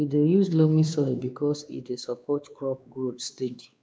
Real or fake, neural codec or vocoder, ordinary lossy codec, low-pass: fake; codec, 16 kHz, 2 kbps, X-Codec, WavLM features, trained on Multilingual LibriSpeech; none; none